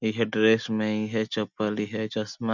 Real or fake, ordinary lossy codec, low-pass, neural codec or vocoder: real; none; 7.2 kHz; none